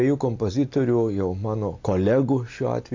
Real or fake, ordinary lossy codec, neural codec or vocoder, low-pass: real; AAC, 48 kbps; none; 7.2 kHz